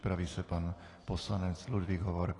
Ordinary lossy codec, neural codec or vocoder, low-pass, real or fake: AAC, 32 kbps; none; 10.8 kHz; real